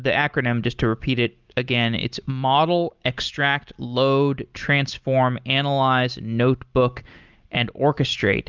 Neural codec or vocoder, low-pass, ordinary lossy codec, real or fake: none; 7.2 kHz; Opus, 24 kbps; real